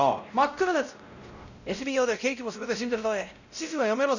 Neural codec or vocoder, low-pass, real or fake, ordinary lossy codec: codec, 16 kHz, 0.5 kbps, X-Codec, WavLM features, trained on Multilingual LibriSpeech; 7.2 kHz; fake; none